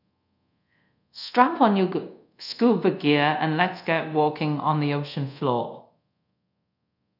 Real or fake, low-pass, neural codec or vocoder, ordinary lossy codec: fake; 5.4 kHz; codec, 24 kHz, 0.5 kbps, DualCodec; none